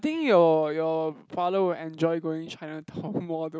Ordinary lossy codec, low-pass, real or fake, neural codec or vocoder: none; none; real; none